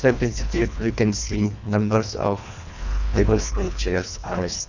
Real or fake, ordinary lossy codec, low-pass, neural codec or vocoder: fake; none; 7.2 kHz; codec, 24 kHz, 1.5 kbps, HILCodec